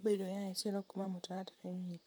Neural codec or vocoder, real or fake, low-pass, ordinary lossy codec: vocoder, 44.1 kHz, 128 mel bands, Pupu-Vocoder; fake; none; none